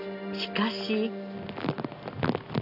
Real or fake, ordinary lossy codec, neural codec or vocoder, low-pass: real; none; none; 5.4 kHz